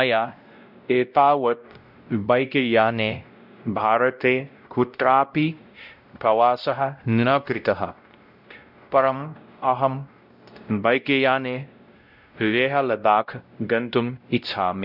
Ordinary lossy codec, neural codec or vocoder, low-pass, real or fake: none; codec, 16 kHz, 0.5 kbps, X-Codec, WavLM features, trained on Multilingual LibriSpeech; 5.4 kHz; fake